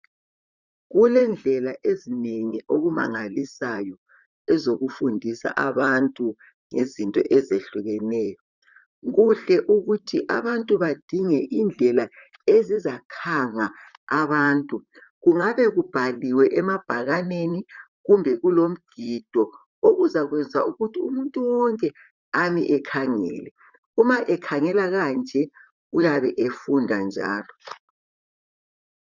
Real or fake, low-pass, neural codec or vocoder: fake; 7.2 kHz; vocoder, 44.1 kHz, 128 mel bands, Pupu-Vocoder